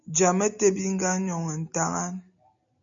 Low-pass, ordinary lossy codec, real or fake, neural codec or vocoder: 7.2 kHz; MP3, 64 kbps; real; none